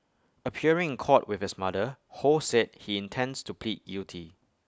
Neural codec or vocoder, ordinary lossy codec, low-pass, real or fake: none; none; none; real